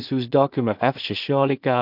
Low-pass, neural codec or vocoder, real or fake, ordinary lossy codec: 5.4 kHz; codec, 16 kHz in and 24 kHz out, 0.4 kbps, LongCat-Audio-Codec, two codebook decoder; fake; MP3, 48 kbps